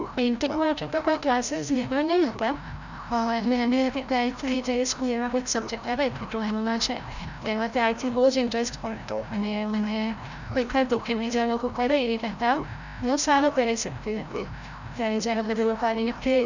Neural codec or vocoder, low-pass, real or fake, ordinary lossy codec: codec, 16 kHz, 0.5 kbps, FreqCodec, larger model; 7.2 kHz; fake; none